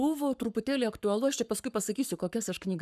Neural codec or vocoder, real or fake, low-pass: codec, 44.1 kHz, 7.8 kbps, Pupu-Codec; fake; 14.4 kHz